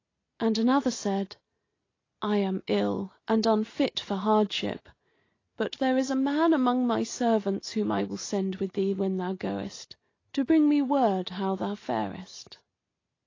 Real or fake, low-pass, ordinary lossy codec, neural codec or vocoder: real; 7.2 kHz; AAC, 32 kbps; none